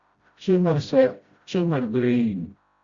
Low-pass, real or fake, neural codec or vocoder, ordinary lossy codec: 7.2 kHz; fake; codec, 16 kHz, 0.5 kbps, FreqCodec, smaller model; Opus, 64 kbps